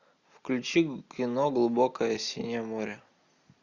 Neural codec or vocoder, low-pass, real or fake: none; 7.2 kHz; real